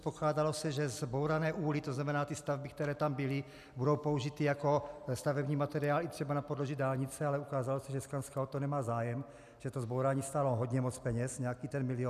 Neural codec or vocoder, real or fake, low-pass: none; real; 14.4 kHz